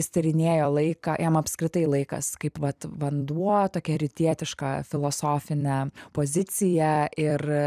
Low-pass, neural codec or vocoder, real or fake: 14.4 kHz; vocoder, 44.1 kHz, 128 mel bands every 256 samples, BigVGAN v2; fake